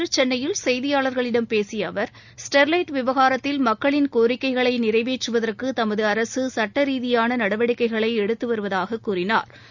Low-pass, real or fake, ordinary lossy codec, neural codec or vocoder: 7.2 kHz; real; none; none